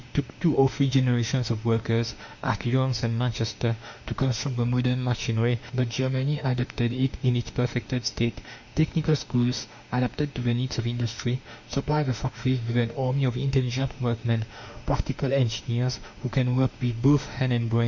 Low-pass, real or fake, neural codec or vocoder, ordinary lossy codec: 7.2 kHz; fake; autoencoder, 48 kHz, 32 numbers a frame, DAC-VAE, trained on Japanese speech; MP3, 64 kbps